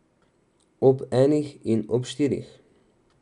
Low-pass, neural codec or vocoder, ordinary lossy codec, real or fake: 10.8 kHz; none; MP3, 96 kbps; real